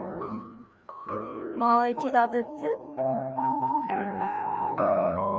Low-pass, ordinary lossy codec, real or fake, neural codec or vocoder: none; none; fake; codec, 16 kHz, 1 kbps, FreqCodec, larger model